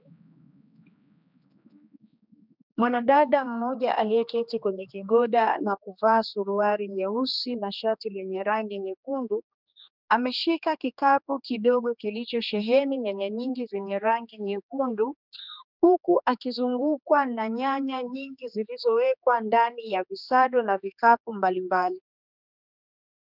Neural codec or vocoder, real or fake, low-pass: codec, 16 kHz, 2 kbps, X-Codec, HuBERT features, trained on general audio; fake; 5.4 kHz